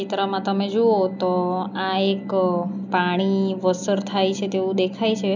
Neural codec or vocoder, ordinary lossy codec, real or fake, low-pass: none; none; real; 7.2 kHz